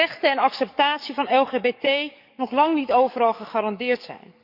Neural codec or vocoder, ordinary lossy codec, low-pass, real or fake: codec, 44.1 kHz, 7.8 kbps, DAC; none; 5.4 kHz; fake